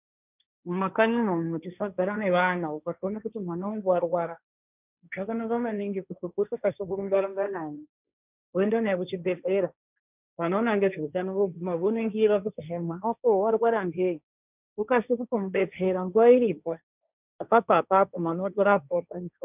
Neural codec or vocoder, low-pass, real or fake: codec, 16 kHz, 1.1 kbps, Voila-Tokenizer; 3.6 kHz; fake